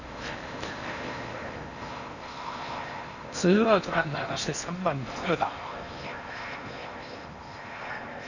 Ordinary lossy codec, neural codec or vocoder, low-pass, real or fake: none; codec, 16 kHz in and 24 kHz out, 0.8 kbps, FocalCodec, streaming, 65536 codes; 7.2 kHz; fake